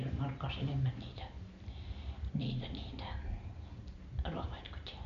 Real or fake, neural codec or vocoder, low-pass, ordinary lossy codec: real; none; 7.2 kHz; MP3, 48 kbps